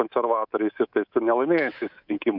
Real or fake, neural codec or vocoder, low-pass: fake; codec, 24 kHz, 3.1 kbps, DualCodec; 5.4 kHz